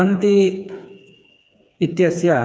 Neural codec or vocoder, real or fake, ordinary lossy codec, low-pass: codec, 16 kHz, 4 kbps, FreqCodec, smaller model; fake; none; none